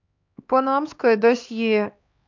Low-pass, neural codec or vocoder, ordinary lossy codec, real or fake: 7.2 kHz; codec, 16 kHz, 2 kbps, X-Codec, HuBERT features, trained on LibriSpeech; MP3, 64 kbps; fake